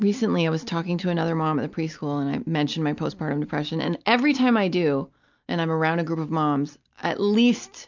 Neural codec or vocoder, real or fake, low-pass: none; real; 7.2 kHz